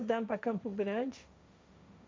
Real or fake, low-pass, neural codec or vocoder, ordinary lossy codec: fake; none; codec, 16 kHz, 1.1 kbps, Voila-Tokenizer; none